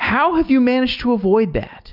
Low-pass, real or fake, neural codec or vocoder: 5.4 kHz; real; none